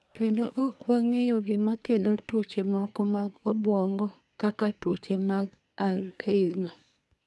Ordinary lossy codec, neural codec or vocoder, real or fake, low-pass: none; codec, 24 kHz, 1 kbps, SNAC; fake; none